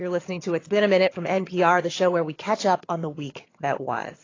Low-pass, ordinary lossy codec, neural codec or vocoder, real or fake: 7.2 kHz; AAC, 32 kbps; vocoder, 22.05 kHz, 80 mel bands, HiFi-GAN; fake